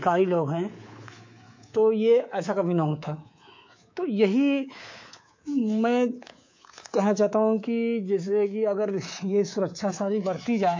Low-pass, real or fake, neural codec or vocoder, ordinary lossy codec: 7.2 kHz; fake; codec, 24 kHz, 3.1 kbps, DualCodec; MP3, 48 kbps